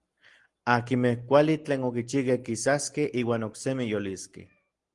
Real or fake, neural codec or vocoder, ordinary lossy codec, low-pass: real; none; Opus, 24 kbps; 10.8 kHz